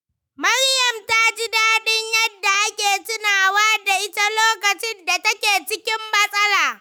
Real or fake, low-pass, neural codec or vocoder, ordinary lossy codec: fake; none; autoencoder, 48 kHz, 128 numbers a frame, DAC-VAE, trained on Japanese speech; none